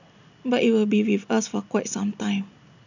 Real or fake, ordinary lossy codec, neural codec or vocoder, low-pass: real; none; none; 7.2 kHz